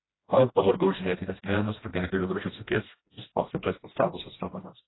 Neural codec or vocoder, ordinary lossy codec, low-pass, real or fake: codec, 16 kHz, 1 kbps, FreqCodec, smaller model; AAC, 16 kbps; 7.2 kHz; fake